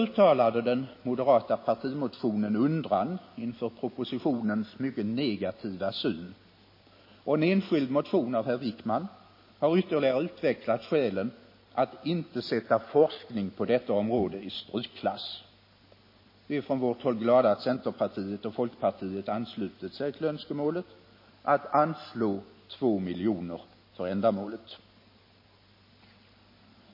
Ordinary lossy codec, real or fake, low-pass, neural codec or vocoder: MP3, 24 kbps; real; 5.4 kHz; none